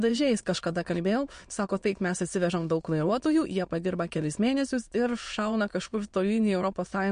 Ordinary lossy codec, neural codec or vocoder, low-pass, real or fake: MP3, 48 kbps; autoencoder, 22.05 kHz, a latent of 192 numbers a frame, VITS, trained on many speakers; 9.9 kHz; fake